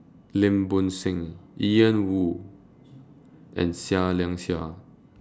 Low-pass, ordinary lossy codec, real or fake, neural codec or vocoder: none; none; real; none